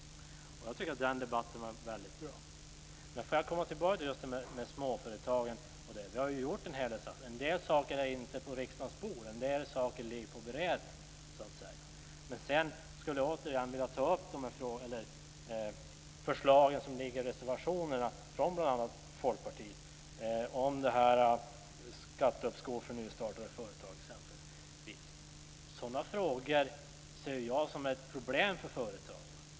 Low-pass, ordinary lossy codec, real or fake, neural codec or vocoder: none; none; real; none